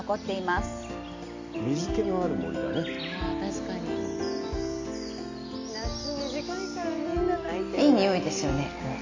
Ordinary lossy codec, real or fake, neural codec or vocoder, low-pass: AAC, 48 kbps; real; none; 7.2 kHz